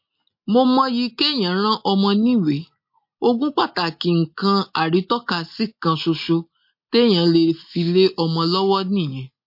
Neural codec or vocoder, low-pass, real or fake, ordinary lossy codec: none; 5.4 kHz; real; MP3, 32 kbps